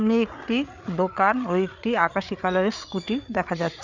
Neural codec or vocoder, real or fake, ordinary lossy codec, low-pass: codec, 16 kHz, 8 kbps, FreqCodec, larger model; fake; none; 7.2 kHz